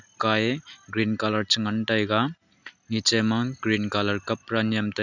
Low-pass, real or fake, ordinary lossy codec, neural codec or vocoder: 7.2 kHz; real; none; none